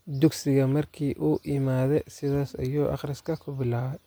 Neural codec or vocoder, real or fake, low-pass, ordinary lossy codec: none; real; none; none